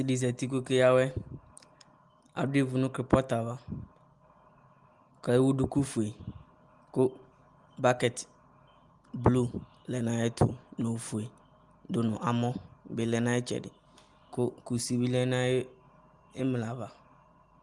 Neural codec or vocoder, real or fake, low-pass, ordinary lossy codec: none; real; 10.8 kHz; Opus, 24 kbps